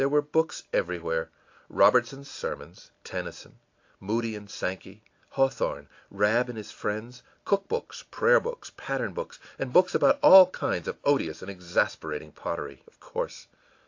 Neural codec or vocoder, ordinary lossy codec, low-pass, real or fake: none; AAC, 48 kbps; 7.2 kHz; real